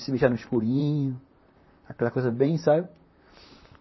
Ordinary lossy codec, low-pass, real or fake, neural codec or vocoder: MP3, 24 kbps; 7.2 kHz; fake; vocoder, 44.1 kHz, 128 mel bands, Pupu-Vocoder